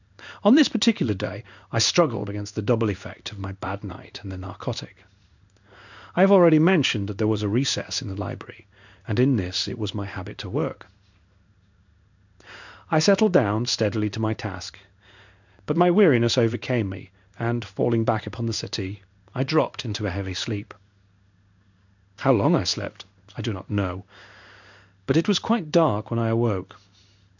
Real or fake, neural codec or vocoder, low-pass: fake; codec, 16 kHz in and 24 kHz out, 1 kbps, XY-Tokenizer; 7.2 kHz